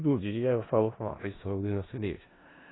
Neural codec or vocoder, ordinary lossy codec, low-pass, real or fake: codec, 16 kHz in and 24 kHz out, 0.4 kbps, LongCat-Audio-Codec, four codebook decoder; AAC, 16 kbps; 7.2 kHz; fake